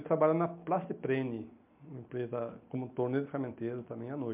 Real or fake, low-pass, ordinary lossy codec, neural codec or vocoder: real; 3.6 kHz; MP3, 32 kbps; none